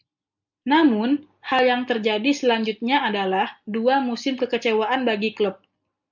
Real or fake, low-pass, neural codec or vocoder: real; 7.2 kHz; none